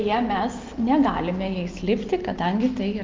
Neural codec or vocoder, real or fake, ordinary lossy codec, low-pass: none; real; Opus, 24 kbps; 7.2 kHz